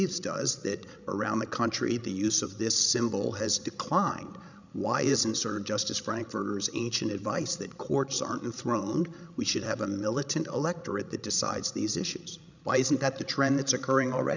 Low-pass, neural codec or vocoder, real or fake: 7.2 kHz; codec, 16 kHz, 16 kbps, FreqCodec, larger model; fake